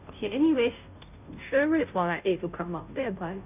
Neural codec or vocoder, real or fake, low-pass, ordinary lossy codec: codec, 16 kHz, 0.5 kbps, FunCodec, trained on Chinese and English, 25 frames a second; fake; 3.6 kHz; none